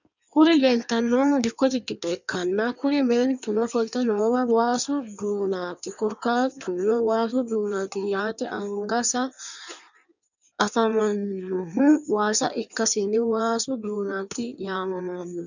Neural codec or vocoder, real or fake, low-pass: codec, 16 kHz in and 24 kHz out, 1.1 kbps, FireRedTTS-2 codec; fake; 7.2 kHz